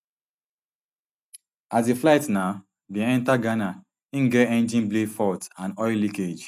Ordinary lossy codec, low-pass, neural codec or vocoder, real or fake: none; 14.4 kHz; none; real